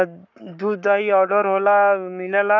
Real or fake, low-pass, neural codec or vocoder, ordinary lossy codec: fake; 7.2 kHz; codec, 44.1 kHz, 7.8 kbps, Pupu-Codec; none